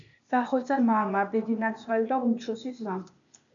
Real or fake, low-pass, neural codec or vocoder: fake; 7.2 kHz; codec, 16 kHz, 0.8 kbps, ZipCodec